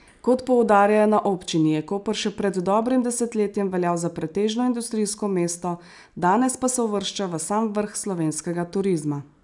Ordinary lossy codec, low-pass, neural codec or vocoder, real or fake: none; 10.8 kHz; none; real